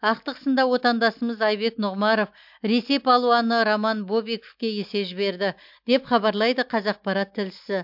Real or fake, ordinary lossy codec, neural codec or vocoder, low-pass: real; MP3, 48 kbps; none; 5.4 kHz